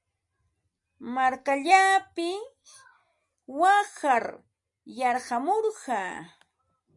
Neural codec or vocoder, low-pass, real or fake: none; 10.8 kHz; real